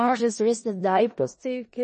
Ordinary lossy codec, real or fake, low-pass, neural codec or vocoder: MP3, 32 kbps; fake; 10.8 kHz; codec, 16 kHz in and 24 kHz out, 0.4 kbps, LongCat-Audio-Codec, four codebook decoder